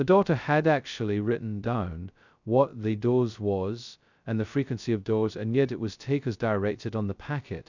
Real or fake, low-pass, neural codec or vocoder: fake; 7.2 kHz; codec, 16 kHz, 0.2 kbps, FocalCodec